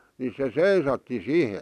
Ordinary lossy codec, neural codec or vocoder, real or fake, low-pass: none; none; real; 14.4 kHz